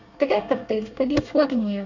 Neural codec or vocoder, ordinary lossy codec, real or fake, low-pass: codec, 24 kHz, 1 kbps, SNAC; none; fake; 7.2 kHz